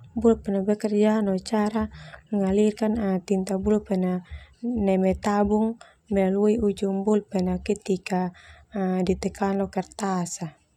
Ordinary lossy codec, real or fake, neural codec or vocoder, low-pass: none; real; none; 19.8 kHz